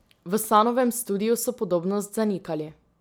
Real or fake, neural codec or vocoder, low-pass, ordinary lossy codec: real; none; none; none